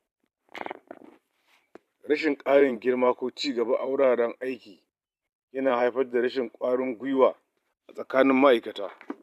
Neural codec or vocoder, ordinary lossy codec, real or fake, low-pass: vocoder, 44.1 kHz, 128 mel bands every 512 samples, BigVGAN v2; none; fake; 14.4 kHz